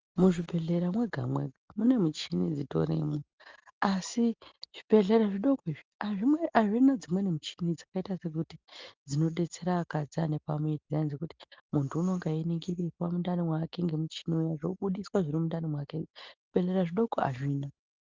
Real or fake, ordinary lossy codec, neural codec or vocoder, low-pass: real; Opus, 24 kbps; none; 7.2 kHz